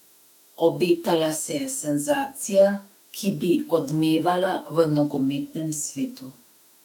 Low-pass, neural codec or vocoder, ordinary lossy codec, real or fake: 19.8 kHz; autoencoder, 48 kHz, 32 numbers a frame, DAC-VAE, trained on Japanese speech; none; fake